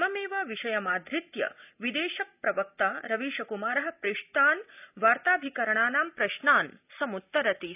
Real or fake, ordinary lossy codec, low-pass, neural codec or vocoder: real; none; 3.6 kHz; none